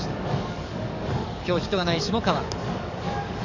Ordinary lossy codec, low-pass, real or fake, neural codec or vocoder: none; 7.2 kHz; fake; codec, 44.1 kHz, 7.8 kbps, DAC